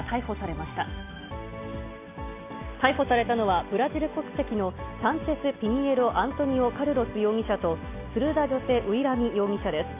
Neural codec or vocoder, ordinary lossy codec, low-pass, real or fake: none; AAC, 24 kbps; 3.6 kHz; real